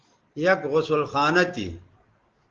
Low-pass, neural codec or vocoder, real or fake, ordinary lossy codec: 7.2 kHz; none; real; Opus, 16 kbps